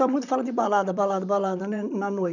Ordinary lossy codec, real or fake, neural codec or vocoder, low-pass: none; fake; vocoder, 44.1 kHz, 128 mel bands, Pupu-Vocoder; 7.2 kHz